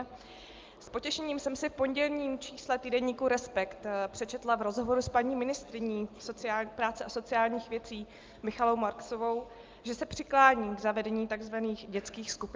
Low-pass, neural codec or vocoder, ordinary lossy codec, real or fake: 7.2 kHz; none; Opus, 24 kbps; real